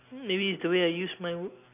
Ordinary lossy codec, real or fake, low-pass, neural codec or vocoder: none; real; 3.6 kHz; none